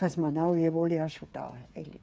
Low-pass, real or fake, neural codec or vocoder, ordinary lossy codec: none; fake; codec, 16 kHz, 8 kbps, FreqCodec, smaller model; none